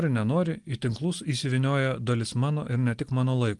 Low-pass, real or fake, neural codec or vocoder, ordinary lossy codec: 10.8 kHz; real; none; Opus, 24 kbps